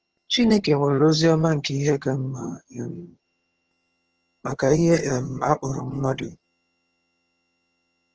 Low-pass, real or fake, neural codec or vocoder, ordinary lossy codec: 7.2 kHz; fake; vocoder, 22.05 kHz, 80 mel bands, HiFi-GAN; Opus, 16 kbps